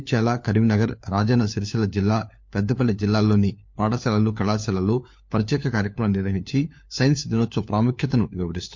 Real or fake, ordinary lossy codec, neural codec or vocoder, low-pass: fake; MP3, 48 kbps; codec, 16 kHz, 4 kbps, FunCodec, trained on LibriTTS, 50 frames a second; 7.2 kHz